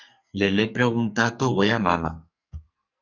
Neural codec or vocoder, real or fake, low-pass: codec, 44.1 kHz, 2.6 kbps, SNAC; fake; 7.2 kHz